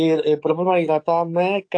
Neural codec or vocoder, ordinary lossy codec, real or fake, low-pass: codec, 44.1 kHz, 7.8 kbps, DAC; AAC, 48 kbps; fake; 9.9 kHz